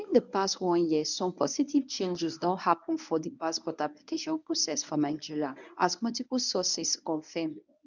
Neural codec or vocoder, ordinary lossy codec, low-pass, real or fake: codec, 24 kHz, 0.9 kbps, WavTokenizer, medium speech release version 1; none; 7.2 kHz; fake